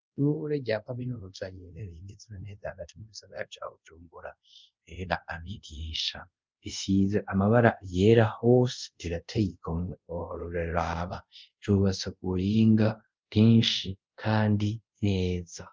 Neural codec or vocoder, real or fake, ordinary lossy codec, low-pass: codec, 24 kHz, 0.5 kbps, DualCodec; fake; Opus, 24 kbps; 7.2 kHz